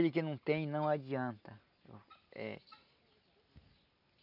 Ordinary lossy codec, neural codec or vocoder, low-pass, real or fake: none; none; 5.4 kHz; real